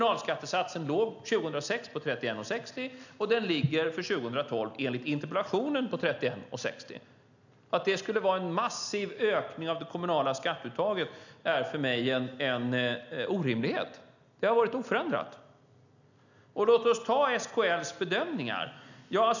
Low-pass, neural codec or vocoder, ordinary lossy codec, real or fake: 7.2 kHz; none; none; real